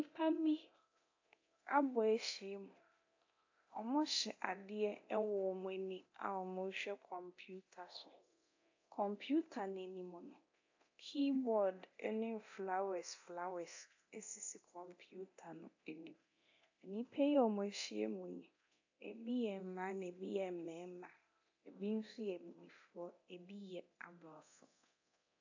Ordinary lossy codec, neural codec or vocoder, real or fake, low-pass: AAC, 48 kbps; codec, 24 kHz, 0.9 kbps, DualCodec; fake; 7.2 kHz